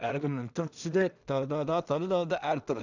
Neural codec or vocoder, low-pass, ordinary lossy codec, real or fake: codec, 16 kHz in and 24 kHz out, 0.4 kbps, LongCat-Audio-Codec, two codebook decoder; 7.2 kHz; none; fake